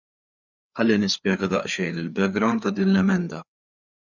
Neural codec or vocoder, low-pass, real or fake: codec, 16 kHz, 4 kbps, FreqCodec, larger model; 7.2 kHz; fake